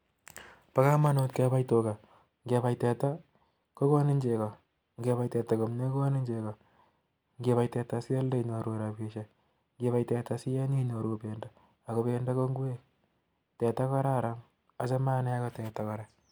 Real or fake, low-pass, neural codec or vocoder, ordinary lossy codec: real; none; none; none